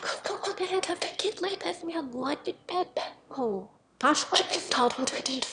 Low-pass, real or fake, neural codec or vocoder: 9.9 kHz; fake; autoencoder, 22.05 kHz, a latent of 192 numbers a frame, VITS, trained on one speaker